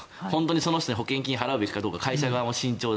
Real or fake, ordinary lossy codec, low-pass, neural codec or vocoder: real; none; none; none